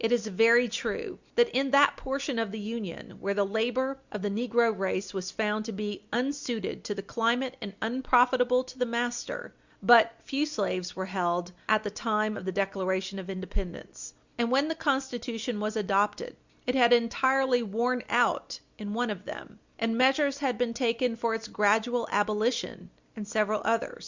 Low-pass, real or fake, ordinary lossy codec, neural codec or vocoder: 7.2 kHz; real; Opus, 64 kbps; none